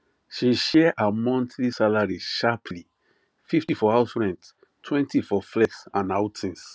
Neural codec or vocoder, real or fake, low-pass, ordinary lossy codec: none; real; none; none